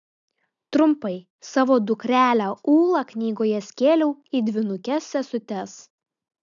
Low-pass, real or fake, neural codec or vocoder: 7.2 kHz; real; none